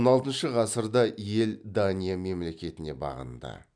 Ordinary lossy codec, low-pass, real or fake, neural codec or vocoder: none; 9.9 kHz; real; none